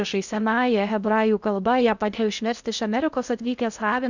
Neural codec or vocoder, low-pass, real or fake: codec, 16 kHz in and 24 kHz out, 0.6 kbps, FocalCodec, streaming, 2048 codes; 7.2 kHz; fake